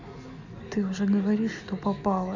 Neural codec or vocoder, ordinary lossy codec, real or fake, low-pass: none; none; real; 7.2 kHz